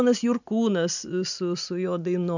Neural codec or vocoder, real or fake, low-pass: none; real; 7.2 kHz